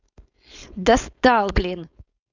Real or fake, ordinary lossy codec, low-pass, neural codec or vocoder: fake; none; 7.2 kHz; codec, 16 kHz, 4.8 kbps, FACodec